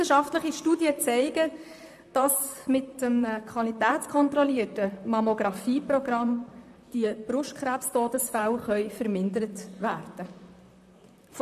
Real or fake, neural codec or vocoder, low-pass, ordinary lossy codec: fake; vocoder, 44.1 kHz, 128 mel bands, Pupu-Vocoder; 14.4 kHz; AAC, 96 kbps